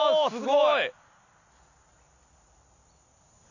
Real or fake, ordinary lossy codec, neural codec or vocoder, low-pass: real; none; none; 7.2 kHz